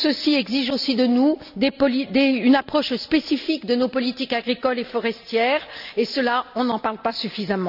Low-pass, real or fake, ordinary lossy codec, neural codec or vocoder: 5.4 kHz; real; none; none